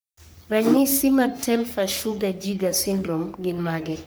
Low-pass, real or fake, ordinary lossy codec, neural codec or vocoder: none; fake; none; codec, 44.1 kHz, 3.4 kbps, Pupu-Codec